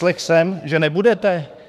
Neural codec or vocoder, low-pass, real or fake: autoencoder, 48 kHz, 32 numbers a frame, DAC-VAE, trained on Japanese speech; 14.4 kHz; fake